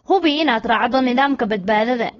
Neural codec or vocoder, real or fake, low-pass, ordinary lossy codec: codec, 16 kHz, 4.8 kbps, FACodec; fake; 7.2 kHz; AAC, 24 kbps